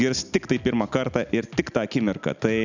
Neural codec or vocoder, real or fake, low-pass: none; real; 7.2 kHz